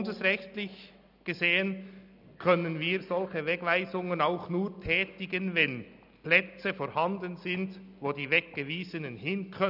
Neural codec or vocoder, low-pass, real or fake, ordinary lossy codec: none; 5.4 kHz; real; none